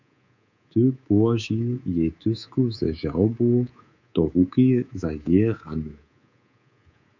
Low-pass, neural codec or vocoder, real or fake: 7.2 kHz; codec, 24 kHz, 3.1 kbps, DualCodec; fake